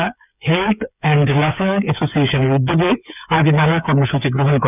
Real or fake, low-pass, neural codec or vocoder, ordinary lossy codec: fake; 3.6 kHz; codec, 16 kHz, 8 kbps, FreqCodec, smaller model; none